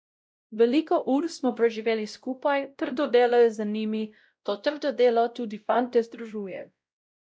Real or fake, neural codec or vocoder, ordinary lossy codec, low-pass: fake; codec, 16 kHz, 0.5 kbps, X-Codec, WavLM features, trained on Multilingual LibriSpeech; none; none